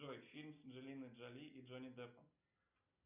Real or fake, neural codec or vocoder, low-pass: real; none; 3.6 kHz